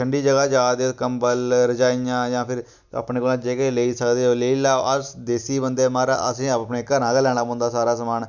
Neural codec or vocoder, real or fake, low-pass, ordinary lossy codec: none; real; 7.2 kHz; none